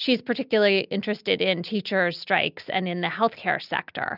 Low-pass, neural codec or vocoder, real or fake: 5.4 kHz; none; real